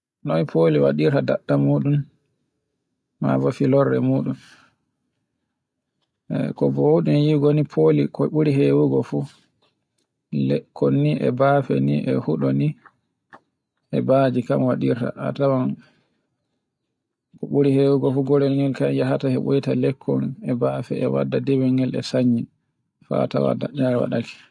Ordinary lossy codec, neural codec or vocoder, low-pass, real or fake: none; none; none; real